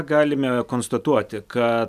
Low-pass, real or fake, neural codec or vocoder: 14.4 kHz; real; none